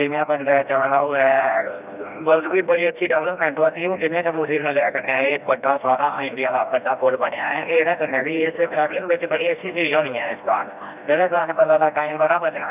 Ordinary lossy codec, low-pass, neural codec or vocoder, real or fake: none; 3.6 kHz; codec, 16 kHz, 1 kbps, FreqCodec, smaller model; fake